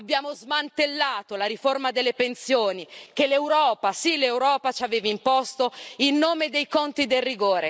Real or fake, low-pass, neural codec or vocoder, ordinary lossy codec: real; none; none; none